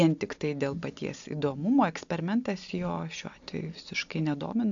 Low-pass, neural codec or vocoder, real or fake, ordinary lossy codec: 7.2 kHz; none; real; AAC, 64 kbps